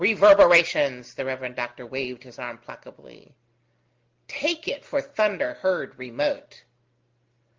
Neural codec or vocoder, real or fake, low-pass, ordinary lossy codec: none; real; 7.2 kHz; Opus, 32 kbps